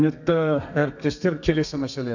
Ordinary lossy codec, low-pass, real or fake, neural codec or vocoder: none; 7.2 kHz; fake; codec, 32 kHz, 1.9 kbps, SNAC